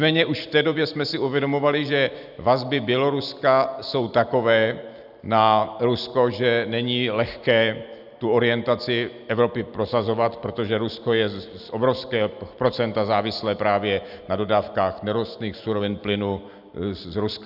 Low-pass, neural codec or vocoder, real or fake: 5.4 kHz; none; real